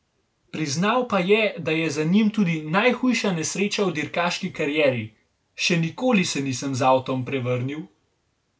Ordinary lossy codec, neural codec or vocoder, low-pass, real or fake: none; none; none; real